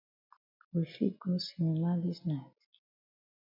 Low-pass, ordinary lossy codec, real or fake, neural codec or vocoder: 5.4 kHz; MP3, 48 kbps; real; none